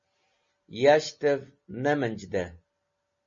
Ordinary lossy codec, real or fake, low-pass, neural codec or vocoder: MP3, 32 kbps; real; 7.2 kHz; none